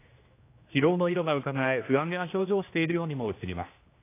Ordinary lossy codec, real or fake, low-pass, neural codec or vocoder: AAC, 24 kbps; fake; 3.6 kHz; codec, 16 kHz, 1 kbps, X-Codec, HuBERT features, trained on general audio